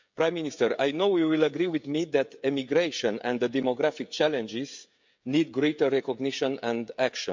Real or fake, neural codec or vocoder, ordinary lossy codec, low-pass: fake; codec, 16 kHz, 16 kbps, FreqCodec, smaller model; MP3, 64 kbps; 7.2 kHz